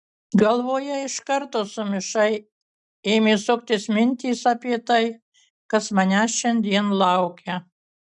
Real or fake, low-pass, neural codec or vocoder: real; 10.8 kHz; none